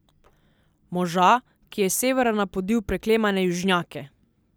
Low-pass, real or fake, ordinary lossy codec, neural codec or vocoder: none; real; none; none